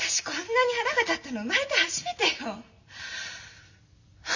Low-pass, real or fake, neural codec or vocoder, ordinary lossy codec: 7.2 kHz; real; none; none